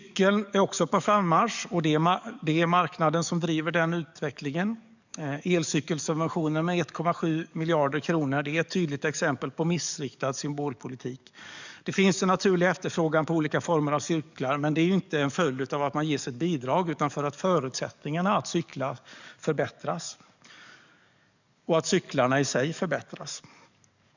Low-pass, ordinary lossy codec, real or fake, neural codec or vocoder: 7.2 kHz; none; fake; codec, 44.1 kHz, 7.8 kbps, DAC